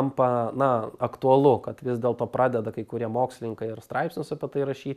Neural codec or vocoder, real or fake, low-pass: none; real; 14.4 kHz